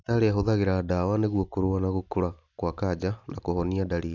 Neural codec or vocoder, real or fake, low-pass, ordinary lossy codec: none; real; 7.2 kHz; none